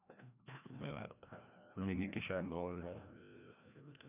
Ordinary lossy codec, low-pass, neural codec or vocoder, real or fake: none; 3.6 kHz; codec, 16 kHz, 1 kbps, FreqCodec, larger model; fake